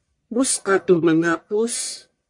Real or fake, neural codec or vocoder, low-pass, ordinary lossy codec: fake; codec, 44.1 kHz, 1.7 kbps, Pupu-Codec; 10.8 kHz; MP3, 48 kbps